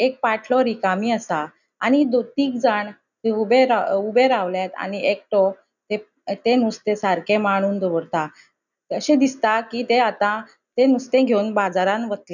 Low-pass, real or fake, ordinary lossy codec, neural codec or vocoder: 7.2 kHz; real; none; none